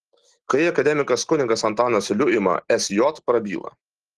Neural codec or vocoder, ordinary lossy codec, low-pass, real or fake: none; Opus, 16 kbps; 10.8 kHz; real